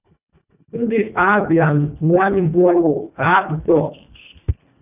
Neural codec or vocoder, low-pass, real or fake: codec, 24 kHz, 1.5 kbps, HILCodec; 3.6 kHz; fake